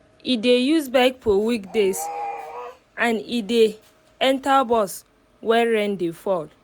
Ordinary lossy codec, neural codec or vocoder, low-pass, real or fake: none; none; none; real